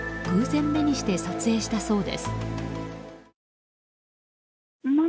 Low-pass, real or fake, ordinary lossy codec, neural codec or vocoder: none; real; none; none